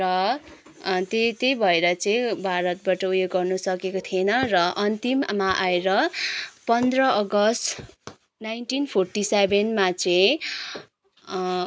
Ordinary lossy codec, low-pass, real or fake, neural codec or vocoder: none; none; real; none